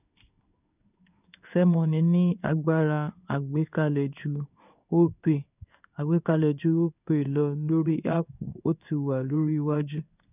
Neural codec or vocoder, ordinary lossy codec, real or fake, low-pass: codec, 16 kHz in and 24 kHz out, 1 kbps, XY-Tokenizer; none; fake; 3.6 kHz